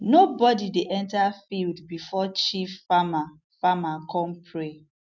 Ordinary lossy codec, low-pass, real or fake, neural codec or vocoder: none; 7.2 kHz; real; none